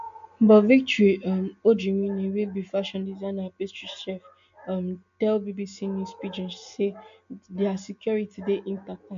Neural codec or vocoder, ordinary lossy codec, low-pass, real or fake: none; AAC, 64 kbps; 7.2 kHz; real